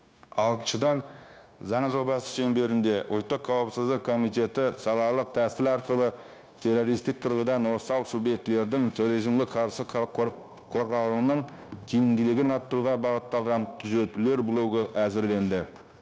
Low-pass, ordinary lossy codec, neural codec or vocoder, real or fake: none; none; codec, 16 kHz, 0.9 kbps, LongCat-Audio-Codec; fake